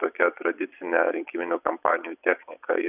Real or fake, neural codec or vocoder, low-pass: fake; vocoder, 22.05 kHz, 80 mel bands, Vocos; 3.6 kHz